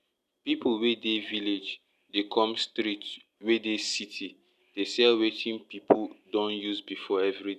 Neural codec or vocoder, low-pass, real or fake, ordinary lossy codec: none; 14.4 kHz; real; none